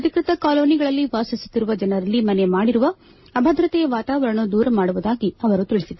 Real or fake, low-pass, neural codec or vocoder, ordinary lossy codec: real; 7.2 kHz; none; MP3, 24 kbps